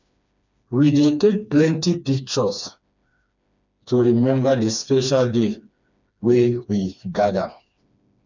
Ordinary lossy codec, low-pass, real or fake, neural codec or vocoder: none; 7.2 kHz; fake; codec, 16 kHz, 2 kbps, FreqCodec, smaller model